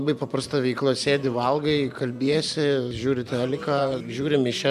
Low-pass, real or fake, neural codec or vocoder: 14.4 kHz; fake; vocoder, 44.1 kHz, 128 mel bands every 512 samples, BigVGAN v2